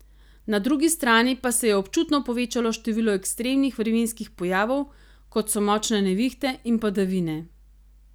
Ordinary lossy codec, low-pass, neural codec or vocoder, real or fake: none; none; none; real